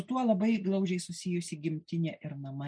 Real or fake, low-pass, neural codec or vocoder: real; 9.9 kHz; none